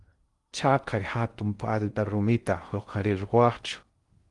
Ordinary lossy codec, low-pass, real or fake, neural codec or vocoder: Opus, 24 kbps; 10.8 kHz; fake; codec, 16 kHz in and 24 kHz out, 0.6 kbps, FocalCodec, streaming, 2048 codes